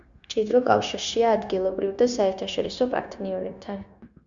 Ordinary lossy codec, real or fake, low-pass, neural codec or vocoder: Opus, 64 kbps; fake; 7.2 kHz; codec, 16 kHz, 0.9 kbps, LongCat-Audio-Codec